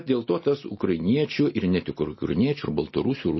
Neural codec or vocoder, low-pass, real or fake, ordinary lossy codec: none; 7.2 kHz; real; MP3, 24 kbps